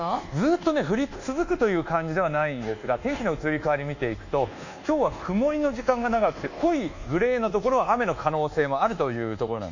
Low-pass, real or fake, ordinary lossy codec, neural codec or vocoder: 7.2 kHz; fake; none; codec, 24 kHz, 1.2 kbps, DualCodec